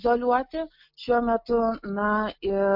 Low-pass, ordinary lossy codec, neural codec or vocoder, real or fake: 5.4 kHz; MP3, 48 kbps; none; real